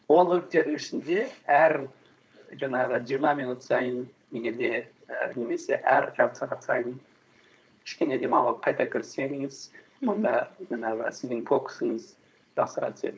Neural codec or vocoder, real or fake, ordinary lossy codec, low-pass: codec, 16 kHz, 4.8 kbps, FACodec; fake; none; none